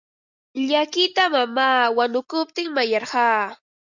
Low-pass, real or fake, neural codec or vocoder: 7.2 kHz; real; none